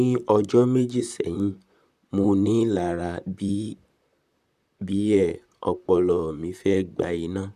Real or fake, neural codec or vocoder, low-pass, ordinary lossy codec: fake; vocoder, 44.1 kHz, 128 mel bands, Pupu-Vocoder; 14.4 kHz; none